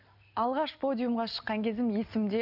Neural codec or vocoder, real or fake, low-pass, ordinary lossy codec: none; real; 5.4 kHz; none